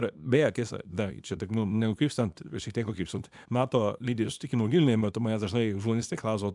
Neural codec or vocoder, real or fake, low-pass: codec, 24 kHz, 0.9 kbps, WavTokenizer, small release; fake; 10.8 kHz